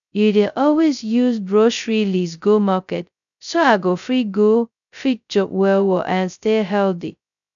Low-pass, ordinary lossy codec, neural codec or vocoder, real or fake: 7.2 kHz; none; codec, 16 kHz, 0.2 kbps, FocalCodec; fake